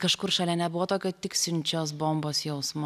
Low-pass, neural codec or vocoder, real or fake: 14.4 kHz; none; real